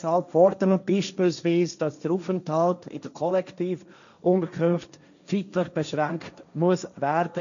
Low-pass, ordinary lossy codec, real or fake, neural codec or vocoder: 7.2 kHz; none; fake; codec, 16 kHz, 1.1 kbps, Voila-Tokenizer